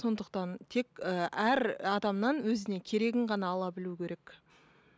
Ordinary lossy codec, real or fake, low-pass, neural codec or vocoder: none; real; none; none